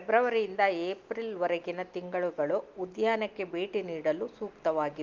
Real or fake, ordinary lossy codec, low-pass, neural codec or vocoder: real; Opus, 24 kbps; 7.2 kHz; none